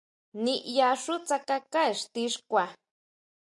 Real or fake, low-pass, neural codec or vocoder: real; 10.8 kHz; none